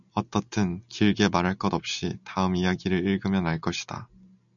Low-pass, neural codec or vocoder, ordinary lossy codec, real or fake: 7.2 kHz; none; MP3, 96 kbps; real